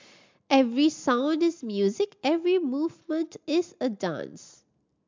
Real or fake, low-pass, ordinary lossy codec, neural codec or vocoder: real; 7.2 kHz; MP3, 64 kbps; none